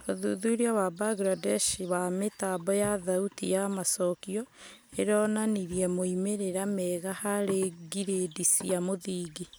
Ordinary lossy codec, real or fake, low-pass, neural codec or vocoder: none; real; none; none